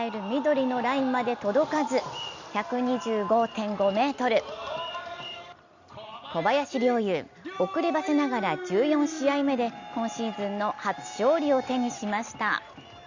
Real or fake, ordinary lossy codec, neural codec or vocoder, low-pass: real; Opus, 64 kbps; none; 7.2 kHz